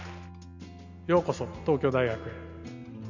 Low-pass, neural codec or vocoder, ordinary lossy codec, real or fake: 7.2 kHz; none; none; real